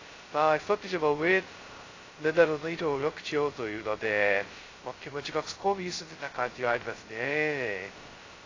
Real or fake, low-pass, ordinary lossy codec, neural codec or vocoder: fake; 7.2 kHz; AAC, 32 kbps; codec, 16 kHz, 0.2 kbps, FocalCodec